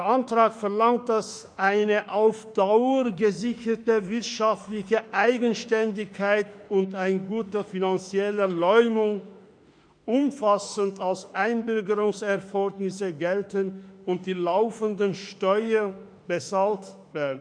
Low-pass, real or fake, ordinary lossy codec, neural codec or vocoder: 9.9 kHz; fake; none; autoencoder, 48 kHz, 32 numbers a frame, DAC-VAE, trained on Japanese speech